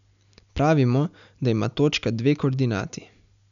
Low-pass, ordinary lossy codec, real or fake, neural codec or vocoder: 7.2 kHz; none; real; none